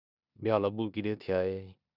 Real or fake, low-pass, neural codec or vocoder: fake; 5.4 kHz; codec, 16 kHz in and 24 kHz out, 0.9 kbps, LongCat-Audio-Codec, four codebook decoder